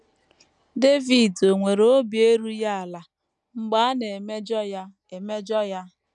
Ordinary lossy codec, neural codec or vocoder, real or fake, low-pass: none; none; real; 10.8 kHz